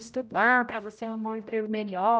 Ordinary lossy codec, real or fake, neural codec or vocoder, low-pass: none; fake; codec, 16 kHz, 0.5 kbps, X-Codec, HuBERT features, trained on general audio; none